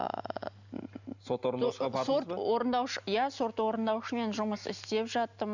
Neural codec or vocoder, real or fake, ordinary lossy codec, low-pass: none; real; none; 7.2 kHz